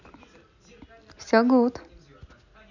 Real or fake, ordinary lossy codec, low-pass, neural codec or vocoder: real; none; 7.2 kHz; none